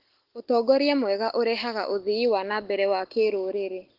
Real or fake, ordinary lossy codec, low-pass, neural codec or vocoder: real; Opus, 16 kbps; 5.4 kHz; none